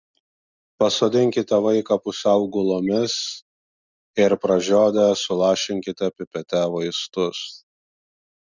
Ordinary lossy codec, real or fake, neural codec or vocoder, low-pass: Opus, 64 kbps; real; none; 7.2 kHz